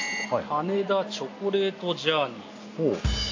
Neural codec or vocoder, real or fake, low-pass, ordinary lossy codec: none; real; 7.2 kHz; AAC, 48 kbps